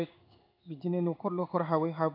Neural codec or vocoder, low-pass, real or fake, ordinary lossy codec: codec, 16 kHz in and 24 kHz out, 1 kbps, XY-Tokenizer; 5.4 kHz; fake; none